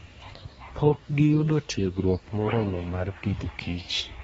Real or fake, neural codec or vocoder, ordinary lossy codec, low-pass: fake; codec, 24 kHz, 1 kbps, SNAC; AAC, 24 kbps; 10.8 kHz